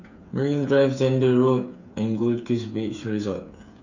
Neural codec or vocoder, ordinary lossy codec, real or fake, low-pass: codec, 16 kHz, 8 kbps, FreqCodec, smaller model; none; fake; 7.2 kHz